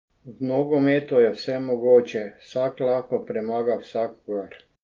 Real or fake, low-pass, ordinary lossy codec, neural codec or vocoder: real; 7.2 kHz; Opus, 32 kbps; none